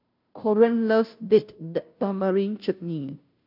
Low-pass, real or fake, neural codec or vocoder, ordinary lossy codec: 5.4 kHz; fake; codec, 16 kHz, 0.5 kbps, FunCodec, trained on Chinese and English, 25 frames a second; none